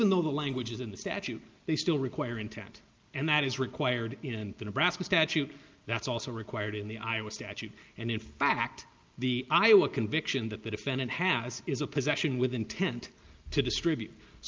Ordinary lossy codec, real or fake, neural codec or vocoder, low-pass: Opus, 24 kbps; real; none; 7.2 kHz